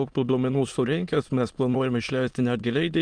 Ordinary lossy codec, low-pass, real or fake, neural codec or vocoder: Opus, 32 kbps; 9.9 kHz; fake; autoencoder, 22.05 kHz, a latent of 192 numbers a frame, VITS, trained on many speakers